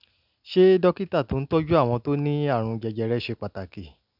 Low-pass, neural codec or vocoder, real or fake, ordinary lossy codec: 5.4 kHz; none; real; none